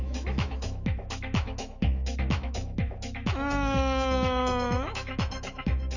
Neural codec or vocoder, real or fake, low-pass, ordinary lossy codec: autoencoder, 48 kHz, 128 numbers a frame, DAC-VAE, trained on Japanese speech; fake; 7.2 kHz; none